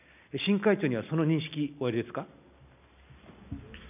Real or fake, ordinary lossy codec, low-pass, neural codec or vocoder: real; none; 3.6 kHz; none